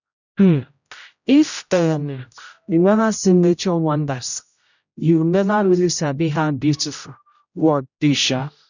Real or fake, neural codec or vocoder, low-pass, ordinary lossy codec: fake; codec, 16 kHz, 0.5 kbps, X-Codec, HuBERT features, trained on general audio; 7.2 kHz; none